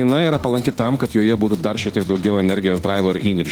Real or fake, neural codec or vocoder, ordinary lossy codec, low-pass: fake; autoencoder, 48 kHz, 32 numbers a frame, DAC-VAE, trained on Japanese speech; Opus, 24 kbps; 19.8 kHz